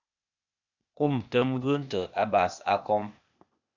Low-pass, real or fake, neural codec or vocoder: 7.2 kHz; fake; codec, 16 kHz, 0.8 kbps, ZipCodec